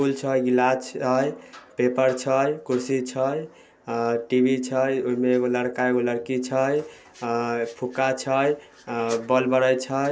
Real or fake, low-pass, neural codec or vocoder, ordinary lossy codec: real; none; none; none